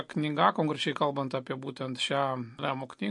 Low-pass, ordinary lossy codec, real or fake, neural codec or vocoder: 10.8 kHz; MP3, 48 kbps; real; none